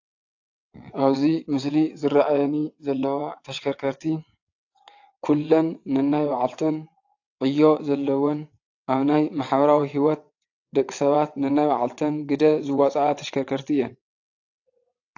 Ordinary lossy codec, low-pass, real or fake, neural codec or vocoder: AAC, 48 kbps; 7.2 kHz; fake; vocoder, 22.05 kHz, 80 mel bands, WaveNeXt